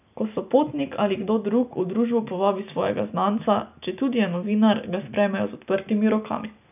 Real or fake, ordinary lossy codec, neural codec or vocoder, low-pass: real; none; none; 3.6 kHz